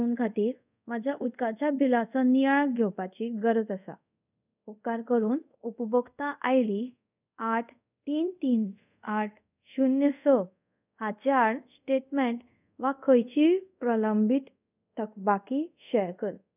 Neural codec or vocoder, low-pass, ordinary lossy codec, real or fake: codec, 24 kHz, 0.5 kbps, DualCodec; 3.6 kHz; none; fake